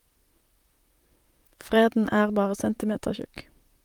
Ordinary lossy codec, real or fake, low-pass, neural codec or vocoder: Opus, 32 kbps; fake; 19.8 kHz; vocoder, 44.1 kHz, 128 mel bands, Pupu-Vocoder